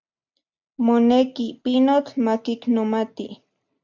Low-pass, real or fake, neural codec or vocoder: 7.2 kHz; real; none